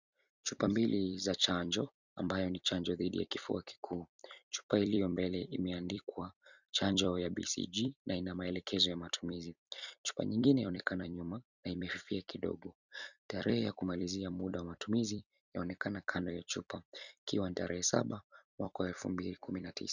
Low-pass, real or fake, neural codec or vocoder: 7.2 kHz; fake; vocoder, 44.1 kHz, 128 mel bands every 256 samples, BigVGAN v2